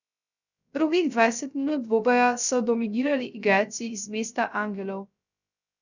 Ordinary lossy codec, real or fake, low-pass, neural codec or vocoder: none; fake; 7.2 kHz; codec, 16 kHz, 0.3 kbps, FocalCodec